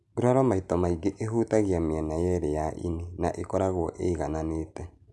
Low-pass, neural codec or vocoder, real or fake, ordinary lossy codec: none; none; real; none